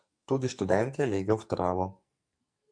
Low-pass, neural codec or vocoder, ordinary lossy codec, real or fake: 9.9 kHz; codec, 44.1 kHz, 2.6 kbps, SNAC; MP3, 64 kbps; fake